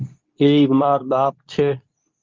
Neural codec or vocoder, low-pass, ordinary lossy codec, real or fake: codec, 24 kHz, 0.9 kbps, WavTokenizer, medium speech release version 2; 7.2 kHz; Opus, 32 kbps; fake